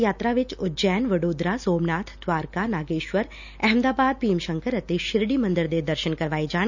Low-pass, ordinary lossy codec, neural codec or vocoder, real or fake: 7.2 kHz; none; none; real